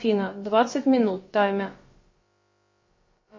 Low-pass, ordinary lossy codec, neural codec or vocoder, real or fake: 7.2 kHz; MP3, 32 kbps; codec, 16 kHz, about 1 kbps, DyCAST, with the encoder's durations; fake